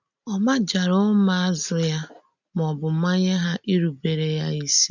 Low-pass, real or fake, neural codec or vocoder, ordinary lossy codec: 7.2 kHz; real; none; none